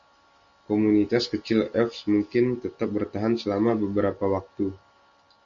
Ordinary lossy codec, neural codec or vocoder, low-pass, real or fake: Opus, 64 kbps; none; 7.2 kHz; real